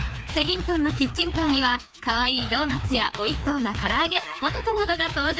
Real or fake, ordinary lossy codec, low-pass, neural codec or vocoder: fake; none; none; codec, 16 kHz, 2 kbps, FreqCodec, larger model